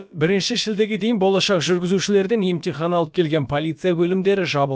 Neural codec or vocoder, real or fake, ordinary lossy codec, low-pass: codec, 16 kHz, about 1 kbps, DyCAST, with the encoder's durations; fake; none; none